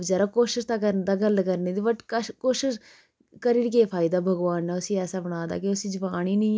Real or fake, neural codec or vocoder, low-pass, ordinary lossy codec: real; none; none; none